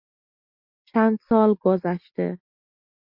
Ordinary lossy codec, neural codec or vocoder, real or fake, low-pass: MP3, 48 kbps; none; real; 5.4 kHz